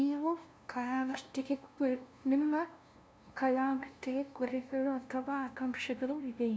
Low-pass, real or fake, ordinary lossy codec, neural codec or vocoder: none; fake; none; codec, 16 kHz, 0.5 kbps, FunCodec, trained on LibriTTS, 25 frames a second